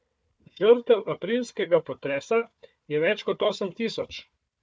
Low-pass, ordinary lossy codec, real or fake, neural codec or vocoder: none; none; fake; codec, 16 kHz, 4 kbps, FunCodec, trained on Chinese and English, 50 frames a second